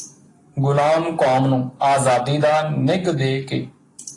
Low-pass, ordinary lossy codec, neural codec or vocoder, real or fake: 10.8 kHz; AAC, 32 kbps; none; real